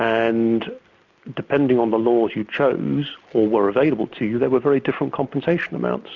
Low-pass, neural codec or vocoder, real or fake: 7.2 kHz; none; real